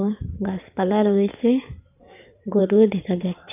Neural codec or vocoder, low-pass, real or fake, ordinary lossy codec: codec, 16 kHz in and 24 kHz out, 2.2 kbps, FireRedTTS-2 codec; 3.6 kHz; fake; none